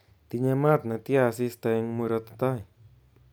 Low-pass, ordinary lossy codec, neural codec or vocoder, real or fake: none; none; none; real